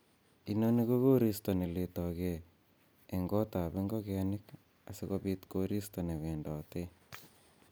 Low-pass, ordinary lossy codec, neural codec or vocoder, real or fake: none; none; none; real